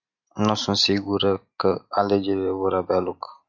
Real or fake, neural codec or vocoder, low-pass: real; none; 7.2 kHz